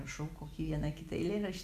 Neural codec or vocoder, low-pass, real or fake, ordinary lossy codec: none; 14.4 kHz; real; Opus, 64 kbps